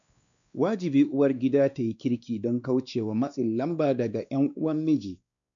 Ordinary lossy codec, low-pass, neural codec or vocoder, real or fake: none; 7.2 kHz; codec, 16 kHz, 2 kbps, X-Codec, WavLM features, trained on Multilingual LibriSpeech; fake